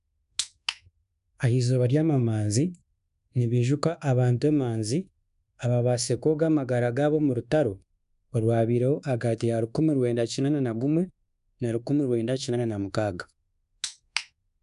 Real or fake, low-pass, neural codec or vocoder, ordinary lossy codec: fake; 10.8 kHz; codec, 24 kHz, 1.2 kbps, DualCodec; none